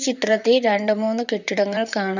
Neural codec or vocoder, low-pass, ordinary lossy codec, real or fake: vocoder, 44.1 kHz, 128 mel bands, Pupu-Vocoder; 7.2 kHz; none; fake